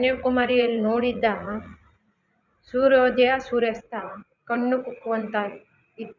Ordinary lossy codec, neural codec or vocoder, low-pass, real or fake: none; vocoder, 44.1 kHz, 80 mel bands, Vocos; 7.2 kHz; fake